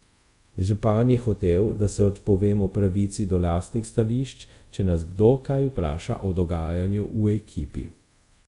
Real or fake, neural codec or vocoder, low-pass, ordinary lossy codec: fake; codec, 24 kHz, 0.5 kbps, DualCodec; 10.8 kHz; MP3, 96 kbps